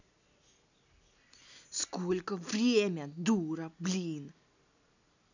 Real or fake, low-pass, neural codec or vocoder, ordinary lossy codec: real; 7.2 kHz; none; none